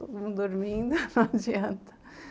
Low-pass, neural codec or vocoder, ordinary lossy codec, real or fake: none; none; none; real